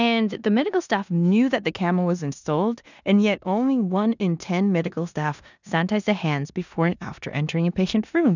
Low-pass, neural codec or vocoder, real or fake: 7.2 kHz; codec, 16 kHz in and 24 kHz out, 0.9 kbps, LongCat-Audio-Codec, four codebook decoder; fake